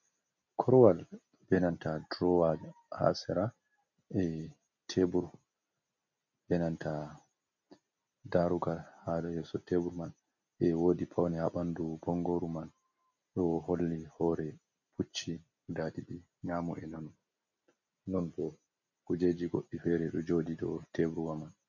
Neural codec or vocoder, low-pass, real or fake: none; 7.2 kHz; real